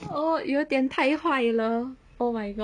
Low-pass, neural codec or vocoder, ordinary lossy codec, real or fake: 9.9 kHz; none; none; real